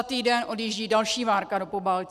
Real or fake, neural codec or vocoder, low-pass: real; none; 14.4 kHz